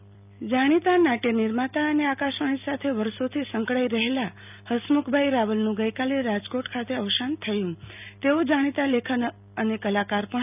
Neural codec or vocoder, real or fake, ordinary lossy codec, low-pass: none; real; none; 3.6 kHz